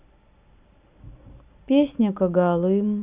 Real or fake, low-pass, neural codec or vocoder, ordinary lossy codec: real; 3.6 kHz; none; Opus, 64 kbps